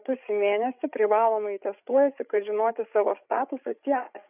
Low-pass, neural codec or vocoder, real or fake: 3.6 kHz; codec, 16 kHz, 8 kbps, FreqCodec, larger model; fake